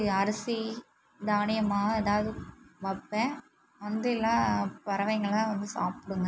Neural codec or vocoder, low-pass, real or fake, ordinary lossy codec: none; none; real; none